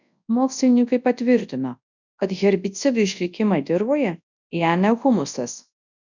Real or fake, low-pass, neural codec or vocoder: fake; 7.2 kHz; codec, 24 kHz, 0.9 kbps, WavTokenizer, large speech release